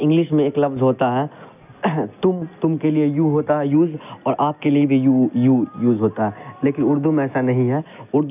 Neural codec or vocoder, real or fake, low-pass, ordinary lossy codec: none; real; 3.6 kHz; none